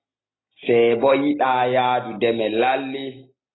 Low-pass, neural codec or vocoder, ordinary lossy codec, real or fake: 7.2 kHz; none; AAC, 16 kbps; real